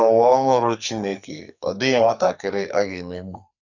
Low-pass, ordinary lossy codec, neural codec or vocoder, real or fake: 7.2 kHz; none; codec, 44.1 kHz, 2.6 kbps, SNAC; fake